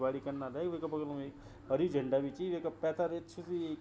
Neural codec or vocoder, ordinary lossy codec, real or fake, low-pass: none; none; real; none